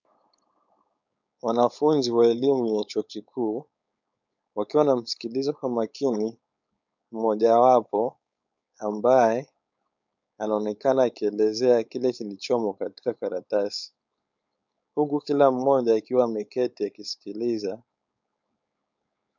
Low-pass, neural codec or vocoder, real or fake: 7.2 kHz; codec, 16 kHz, 4.8 kbps, FACodec; fake